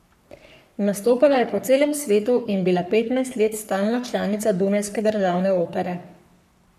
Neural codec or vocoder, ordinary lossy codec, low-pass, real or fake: codec, 44.1 kHz, 3.4 kbps, Pupu-Codec; none; 14.4 kHz; fake